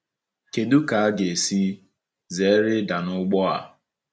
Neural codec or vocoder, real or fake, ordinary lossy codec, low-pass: none; real; none; none